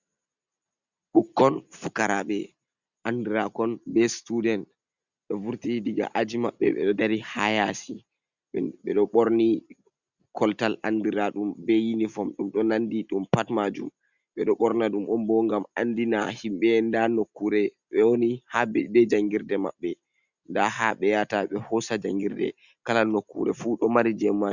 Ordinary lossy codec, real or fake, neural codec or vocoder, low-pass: Opus, 64 kbps; real; none; 7.2 kHz